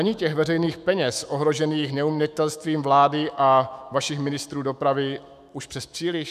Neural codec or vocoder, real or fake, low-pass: autoencoder, 48 kHz, 128 numbers a frame, DAC-VAE, trained on Japanese speech; fake; 14.4 kHz